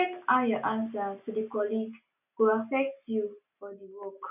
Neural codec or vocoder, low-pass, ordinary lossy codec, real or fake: none; 3.6 kHz; none; real